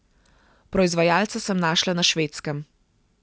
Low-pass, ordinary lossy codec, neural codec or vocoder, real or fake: none; none; none; real